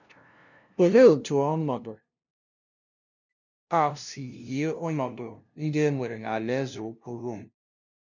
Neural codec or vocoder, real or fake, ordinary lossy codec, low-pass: codec, 16 kHz, 0.5 kbps, FunCodec, trained on LibriTTS, 25 frames a second; fake; none; 7.2 kHz